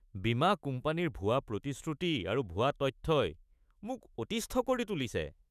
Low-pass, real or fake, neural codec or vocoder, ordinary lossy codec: 14.4 kHz; fake; autoencoder, 48 kHz, 128 numbers a frame, DAC-VAE, trained on Japanese speech; none